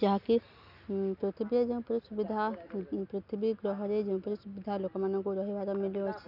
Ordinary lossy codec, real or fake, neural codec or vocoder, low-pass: none; real; none; 5.4 kHz